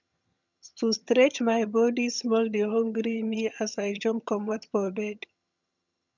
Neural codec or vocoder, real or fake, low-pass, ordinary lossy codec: vocoder, 22.05 kHz, 80 mel bands, HiFi-GAN; fake; 7.2 kHz; none